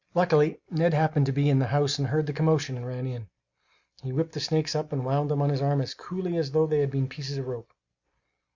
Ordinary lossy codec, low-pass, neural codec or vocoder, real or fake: Opus, 64 kbps; 7.2 kHz; none; real